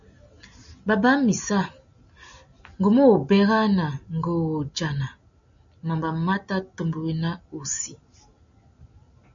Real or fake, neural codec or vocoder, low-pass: real; none; 7.2 kHz